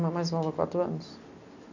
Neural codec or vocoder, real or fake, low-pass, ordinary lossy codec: none; real; 7.2 kHz; none